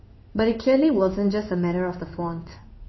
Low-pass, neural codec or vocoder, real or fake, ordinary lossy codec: 7.2 kHz; codec, 16 kHz in and 24 kHz out, 1 kbps, XY-Tokenizer; fake; MP3, 24 kbps